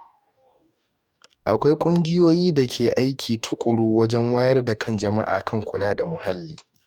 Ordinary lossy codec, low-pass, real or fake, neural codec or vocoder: none; 19.8 kHz; fake; codec, 44.1 kHz, 2.6 kbps, DAC